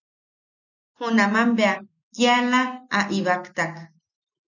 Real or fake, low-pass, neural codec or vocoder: real; 7.2 kHz; none